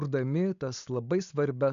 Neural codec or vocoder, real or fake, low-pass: none; real; 7.2 kHz